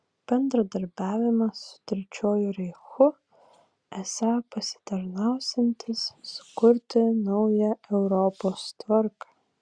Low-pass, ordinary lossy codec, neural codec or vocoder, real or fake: 9.9 kHz; Opus, 64 kbps; none; real